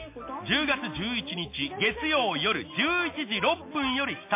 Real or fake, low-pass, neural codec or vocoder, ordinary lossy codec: real; 3.6 kHz; none; MP3, 24 kbps